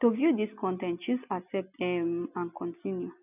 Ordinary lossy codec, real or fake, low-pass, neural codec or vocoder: none; real; 3.6 kHz; none